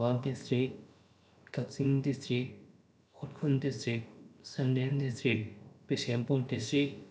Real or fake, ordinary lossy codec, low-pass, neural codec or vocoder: fake; none; none; codec, 16 kHz, about 1 kbps, DyCAST, with the encoder's durations